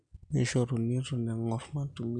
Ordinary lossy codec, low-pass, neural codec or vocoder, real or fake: none; 9.9 kHz; none; real